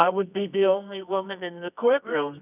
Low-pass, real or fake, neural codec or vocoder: 3.6 kHz; fake; codec, 24 kHz, 0.9 kbps, WavTokenizer, medium music audio release